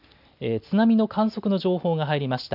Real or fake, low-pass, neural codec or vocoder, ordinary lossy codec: real; 5.4 kHz; none; none